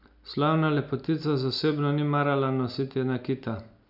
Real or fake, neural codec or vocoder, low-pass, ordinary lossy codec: real; none; 5.4 kHz; none